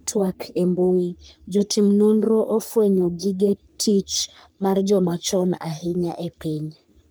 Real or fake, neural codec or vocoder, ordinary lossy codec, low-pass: fake; codec, 44.1 kHz, 3.4 kbps, Pupu-Codec; none; none